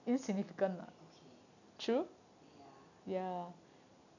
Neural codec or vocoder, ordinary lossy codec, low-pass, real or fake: none; none; 7.2 kHz; real